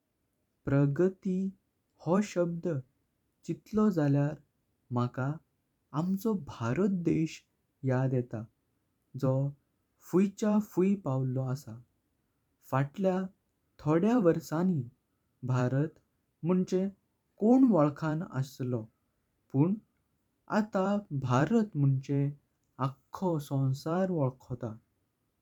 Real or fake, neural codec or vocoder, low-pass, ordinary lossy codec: fake; vocoder, 44.1 kHz, 128 mel bands every 512 samples, BigVGAN v2; 19.8 kHz; none